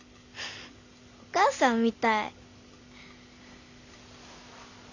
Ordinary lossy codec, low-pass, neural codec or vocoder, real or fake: none; 7.2 kHz; none; real